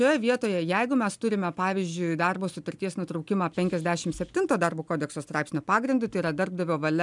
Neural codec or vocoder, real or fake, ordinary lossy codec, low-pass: none; real; MP3, 96 kbps; 10.8 kHz